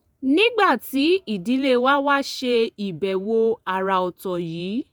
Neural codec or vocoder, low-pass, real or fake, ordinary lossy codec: vocoder, 48 kHz, 128 mel bands, Vocos; none; fake; none